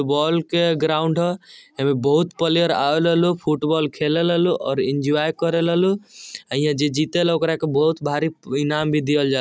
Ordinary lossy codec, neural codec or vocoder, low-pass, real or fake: none; none; none; real